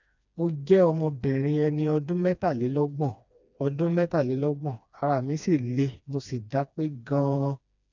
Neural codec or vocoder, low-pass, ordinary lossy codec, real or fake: codec, 16 kHz, 2 kbps, FreqCodec, smaller model; 7.2 kHz; none; fake